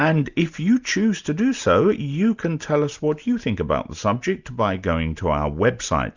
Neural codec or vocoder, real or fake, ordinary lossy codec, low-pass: none; real; Opus, 64 kbps; 7.2 kHz